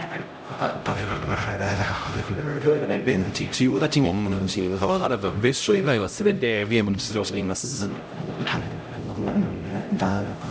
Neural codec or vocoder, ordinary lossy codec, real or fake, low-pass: codec, 16 kHz, 0.5 kbps, X-Codec, HuBERT features, trained on LibriSpeech; none; fake; none